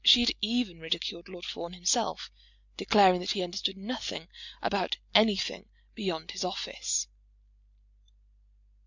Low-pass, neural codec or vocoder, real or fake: 7.2 kHz; none; real